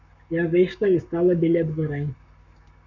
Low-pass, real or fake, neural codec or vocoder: 7.2 kHz; fake; codec, 44.1 kHz, 7.8 kbps, Pupu-Codec